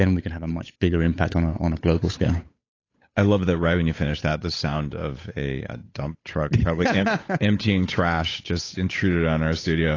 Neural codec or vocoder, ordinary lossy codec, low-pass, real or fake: codec, 16 kHz, 8 kbps, FunCodec, trained on Chinese and English, 25 frames a second; AAC, 32 kbps; 7.2 kHz; fake